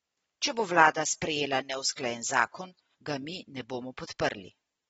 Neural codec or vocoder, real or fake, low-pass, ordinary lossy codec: none; real; 19.8 kHz; AAC, 24 kbps